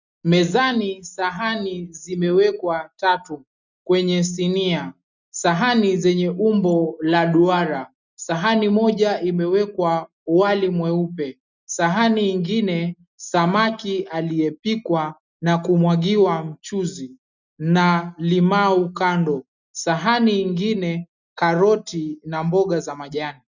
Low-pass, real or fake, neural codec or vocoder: 7.2 kHz; real; none